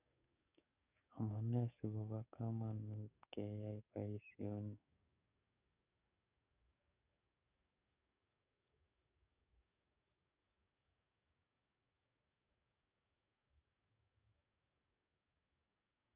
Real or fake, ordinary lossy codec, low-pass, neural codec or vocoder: fake; none; 3.6 kHz; codec, 44.1 kHz, 7.8 kbps, DAC